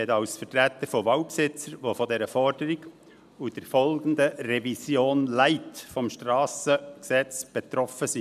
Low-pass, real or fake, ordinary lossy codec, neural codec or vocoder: 14.4 kHz; real; none; none